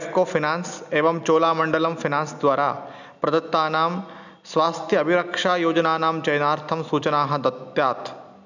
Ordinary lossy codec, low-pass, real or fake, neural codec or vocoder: none; 7.2 kHz; real; none